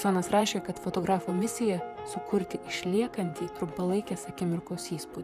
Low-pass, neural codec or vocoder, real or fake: 14.4 kHz; vocoder, 44.1 kHz, 128 mel bands, Pupu-Vocoder; fake